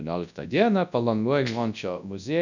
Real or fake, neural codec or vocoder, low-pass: fake; codec, 24 kHz, 0.9 kbps, WavTokenizer, large speech release; 7.2 kHz